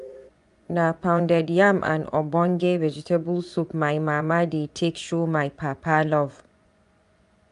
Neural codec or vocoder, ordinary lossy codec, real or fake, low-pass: vocoder, 24 kHz, 100 mel bands, Vocos; none; fake; 10.8 kHz